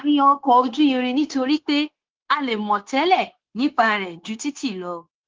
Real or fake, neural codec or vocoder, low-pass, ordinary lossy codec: fake; codec, 16 kHz, 0.9 kbps, LongCat-Audio-Codec; 7.2 kHz; Opus, 16 kbps